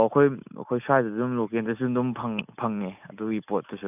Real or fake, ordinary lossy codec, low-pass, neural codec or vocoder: real; none; 3.6 kHz; none